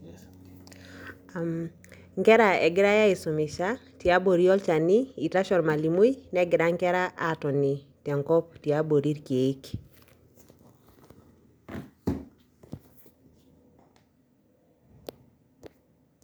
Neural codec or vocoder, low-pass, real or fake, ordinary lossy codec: none; none; real; none